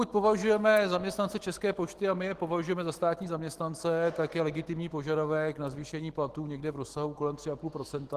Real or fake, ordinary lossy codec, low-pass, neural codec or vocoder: fake; Opus, 16 kbps; 14.4 kHz; autoencoder, 48 kHz, 128 numbers a frame, DAC-VAE, trained on Japanese speech